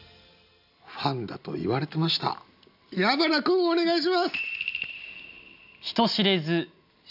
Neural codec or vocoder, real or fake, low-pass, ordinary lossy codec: none; real; 5.4 kHz; none